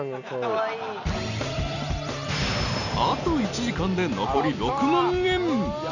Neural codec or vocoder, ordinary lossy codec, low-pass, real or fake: none; none; 7.2 kHz; real